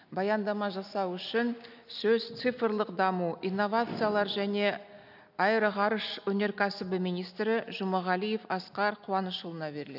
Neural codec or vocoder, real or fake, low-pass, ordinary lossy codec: none; real; 5.4 kHz; none